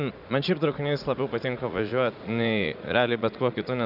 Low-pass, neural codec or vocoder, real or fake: 5.4 kHz; vocoder, 44.1 kHz, 80 mel bands, Vocos; fake